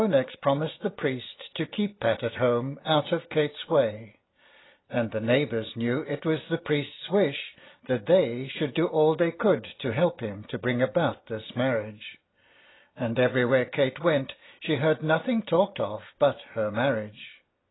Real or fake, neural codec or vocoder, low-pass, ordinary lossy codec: real; none; 7.2 kHz; AAC, 16 kbps